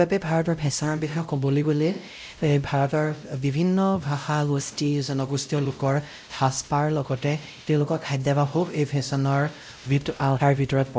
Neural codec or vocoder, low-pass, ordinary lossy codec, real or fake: codec, 16 kHz, 0.5 kbps, X-Codec, WavLM features, trained on Multilingual LibriSpeech; none; none; fake